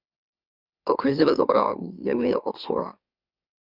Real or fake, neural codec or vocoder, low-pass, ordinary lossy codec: fake; autoencoder, 44.1 kHz, a latent of 192 numbers a frame, MeloTTS; 5.4 kHz; Opus, 64 kbps